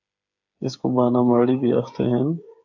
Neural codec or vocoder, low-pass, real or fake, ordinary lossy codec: codec, 16 kHz, 8 kbps, FreqCodec, smaller model; 7.2 kHz; fake; AAC, 48 kbps